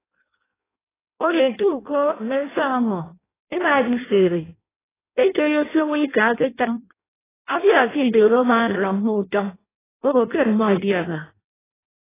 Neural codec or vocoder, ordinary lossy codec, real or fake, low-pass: codec, 16 kHz in and 24 kHz out, 0.6 kbps, FireRedTTS-2 codec; AAC, 16 kbps; fake; 3.6 kHz